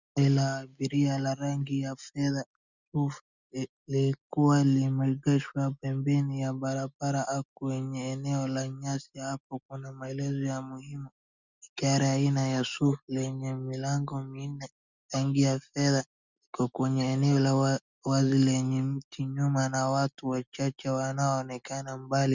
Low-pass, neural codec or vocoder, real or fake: 7.2 kHz; none; real